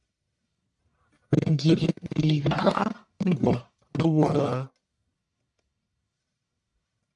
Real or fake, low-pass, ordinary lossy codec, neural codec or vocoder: fake; 10.8 kHz; MP3, 96 kbps; codec, 44.1 kHz, 1.7 kbps, Pupu-Codec